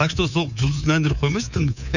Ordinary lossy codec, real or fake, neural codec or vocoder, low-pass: none; fake; codec, 24 kHz, 3.1 kbps, DualCodec; 7.2 kHz